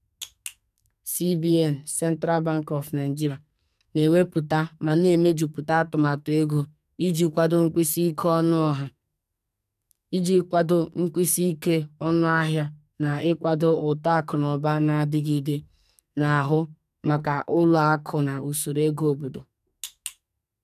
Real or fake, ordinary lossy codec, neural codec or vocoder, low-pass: fake; none; codec, 44.1 kHz, 2.6 kbps, SNAC; 14.4 kHz